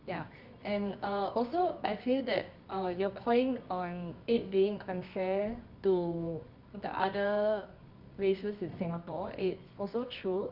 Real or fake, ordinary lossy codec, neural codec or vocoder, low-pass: fake; none; codec, 24 kHz, 0.9 kbps, WavTokenizer, medium music audio release; 5.4 kHz